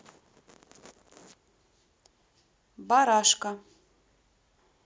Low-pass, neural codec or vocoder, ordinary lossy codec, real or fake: none; none; none; real